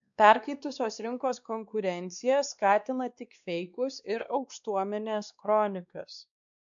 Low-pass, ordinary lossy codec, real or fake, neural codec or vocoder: 7.2 kHz; MP3, 96 kbps; fake; codec, 16 kHz, 2 kbps, X-Codec, WavLM features, trained on Multilingual LibriSpeech